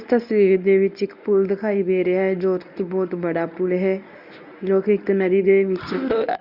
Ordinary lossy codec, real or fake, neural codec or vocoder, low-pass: none; fake; codec, 24 kHz, 0.9 kbps, WavTokenizer, medium speech release version 1; 5.4 kHz